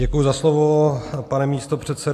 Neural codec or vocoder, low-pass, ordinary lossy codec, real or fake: none; 14.4 kHz; AAC, 64 kbps; real